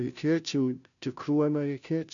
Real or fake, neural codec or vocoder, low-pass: fake; codec, 16 kHz, 0.5 kbps, FunCodec, trained on Chinese and English, 25 frames a second; 7.2 kHz